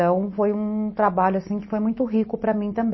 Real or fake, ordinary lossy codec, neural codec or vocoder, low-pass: real; MP3, 24 kbps; none; 7.2 kHz